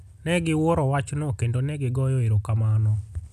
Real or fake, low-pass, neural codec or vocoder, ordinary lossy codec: real; 14.4 kHz; none; none